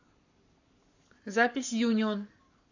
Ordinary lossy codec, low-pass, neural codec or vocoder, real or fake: AAC, 48 kbps; 7.2 kHz; codec, 44.1 kHz, 7.8 kbps, Pupu-Codec; fake